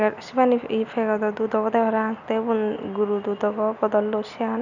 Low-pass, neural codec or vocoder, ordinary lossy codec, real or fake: 7.2 kHz; none; none; real